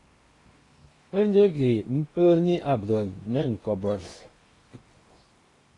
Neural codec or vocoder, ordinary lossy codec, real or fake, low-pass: codec, 16 kHz in and 24 kHz out, 0.8 kbps, FocalCodec, streaming, 65536 codes; AAC, 32 kbps; fake; 10.8 kHz